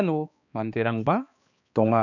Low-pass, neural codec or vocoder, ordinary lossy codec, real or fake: 7.2 kHz; codec, 16 kHz, 2 kbps, X-Codec, HuBERT features, trained on balanced general audio; none; fake